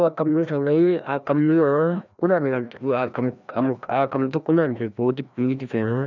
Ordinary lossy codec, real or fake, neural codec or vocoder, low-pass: none; fake; codec, 16 kHz, 1 kbps, FreqCodec, larger model; 7.2 kHz